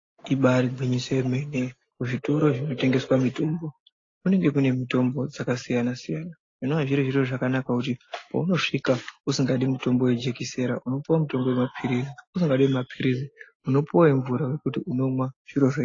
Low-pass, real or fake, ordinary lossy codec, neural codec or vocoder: 7.2 kHz; real; AAC, 32 kbps; none